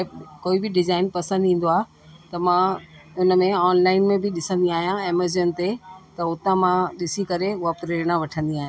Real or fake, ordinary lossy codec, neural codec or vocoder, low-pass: real; none; none; none